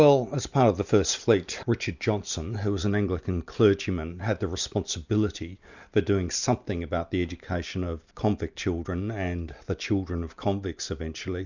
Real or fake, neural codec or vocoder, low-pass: real; none; 7.2 kHz